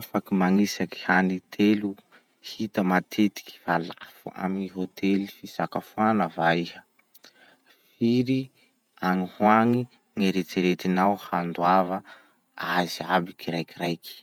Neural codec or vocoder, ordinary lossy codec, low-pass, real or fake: vocoder, 48 kHz, 128 mel bands, Vocos; none; 19.8 kHz; fake